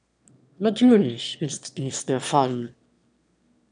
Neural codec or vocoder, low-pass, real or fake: autoencoder, 22.05 kHz, a latent of 192 numbers a frame, VITS, trained on one speaker; 9.9 kHz; fake